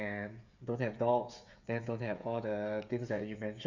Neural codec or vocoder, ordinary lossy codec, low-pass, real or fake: codec, 16 kHz, 16 kbps, FreqCodec, smaller model; none; 7.2 kHz; fake